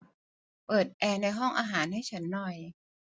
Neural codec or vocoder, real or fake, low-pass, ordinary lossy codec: none; real; none; none